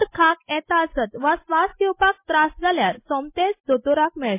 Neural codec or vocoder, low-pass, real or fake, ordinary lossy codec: none; 3.6 kHz; real; MP3, 24 kbps